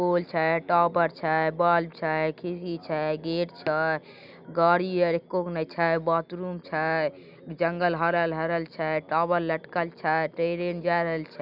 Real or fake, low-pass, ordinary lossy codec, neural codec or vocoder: real; 5.4 kHz; none; none